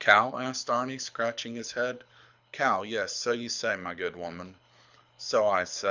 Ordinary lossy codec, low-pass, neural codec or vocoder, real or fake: Opus, 64 kbps; 7.2 kHz; codec, 24 kHz, 6 kbps, HILCodec; fake